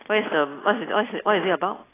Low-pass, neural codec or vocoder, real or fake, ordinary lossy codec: 3.6 kHz; none; real; AAC, 16 kbps